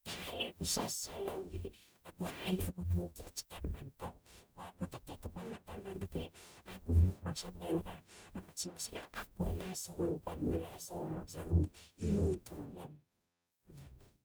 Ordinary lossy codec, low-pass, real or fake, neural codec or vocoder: none; none; fake; codec, 44.1 kHz, 0.9 kbps, DAC